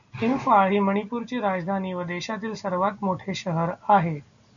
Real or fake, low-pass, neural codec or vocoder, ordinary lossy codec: real; 7.2 kHz; none; MP3, 96 kbps